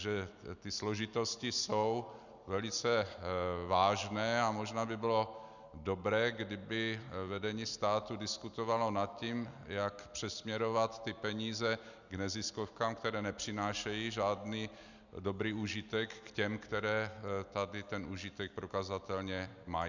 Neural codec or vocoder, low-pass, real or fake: none; 7.2 kHz; real